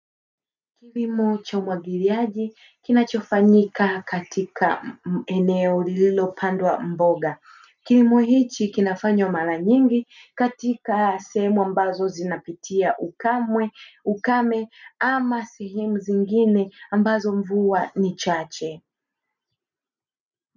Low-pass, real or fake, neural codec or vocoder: 7.2 kHz; real; none